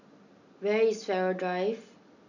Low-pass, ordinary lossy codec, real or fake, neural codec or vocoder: 7.2 kHz; none; real; none